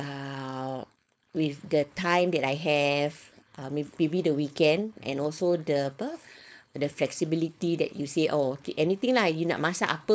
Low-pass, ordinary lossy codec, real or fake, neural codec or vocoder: none; none; fake; codec, 16 kHz, 4.8 kbps, FACodec